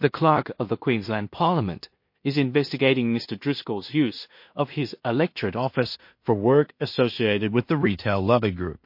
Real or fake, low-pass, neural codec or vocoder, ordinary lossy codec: fake; 5.4 kHz; codec, 16 kHz in and 24 kHz out, 0.4 kbps, LongCat-Audio-Codec, two codebook decoder; MP3, 32 kbps